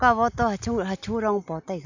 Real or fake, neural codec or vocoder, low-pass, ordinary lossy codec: real; none; 7.2 kHz; none